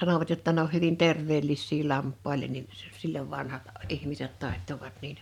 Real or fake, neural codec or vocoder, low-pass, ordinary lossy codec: fake; vocoder, 44.1 kHz, 128 mel bands every 512 samples, BigVGAN v2; 19.8 kHz; none